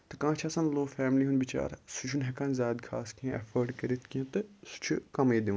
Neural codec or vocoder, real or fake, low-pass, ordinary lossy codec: none; real; none; none